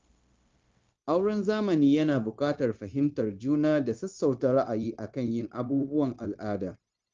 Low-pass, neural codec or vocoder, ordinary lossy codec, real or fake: 7.2 kHz; codec, 16 kHz, 0.9 kbps, LongCat-Audio-Codec; Opus, 24 kbps; fake